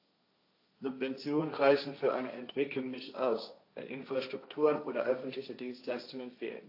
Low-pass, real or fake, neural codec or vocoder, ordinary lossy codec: 5.4 kHz; fake; codec, 16 kHz, 1.1 kbps, Voila-Tokenizer; AAC, 32 kbps